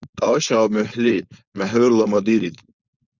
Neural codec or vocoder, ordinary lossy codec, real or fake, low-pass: codec, 16 kHz, 4.8 kbps, FACodec; Opus, 64 kbps; fake; 7.2 kHz